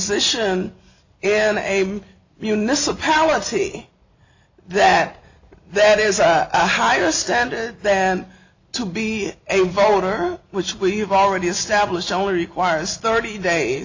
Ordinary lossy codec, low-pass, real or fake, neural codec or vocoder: AAC, 48 kbps; 7.2 kHz; real; none